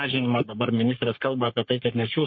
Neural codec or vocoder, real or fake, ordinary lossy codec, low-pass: codec, 44.1 kHz, 3.4 kbps, Pupu-Codec; fake; MP3, 32 kbps; 7.2 kHz